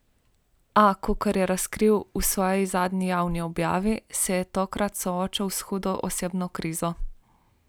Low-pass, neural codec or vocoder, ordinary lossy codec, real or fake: none; none; none; real